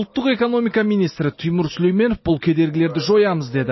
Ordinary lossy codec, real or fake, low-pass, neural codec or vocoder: MP3, 24 kbps; real; 7.2 kHz; none